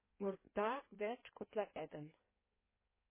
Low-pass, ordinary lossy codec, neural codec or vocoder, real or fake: 3.6 kHz; MP3, 16 kbps; codec, 16 kHz in and 24 kHz out, 1.1 kbps, FireRedTTS-2 codec; fake